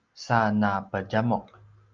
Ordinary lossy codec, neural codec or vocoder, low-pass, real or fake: Opus, 24 kbps; none; 7.2 kHz; real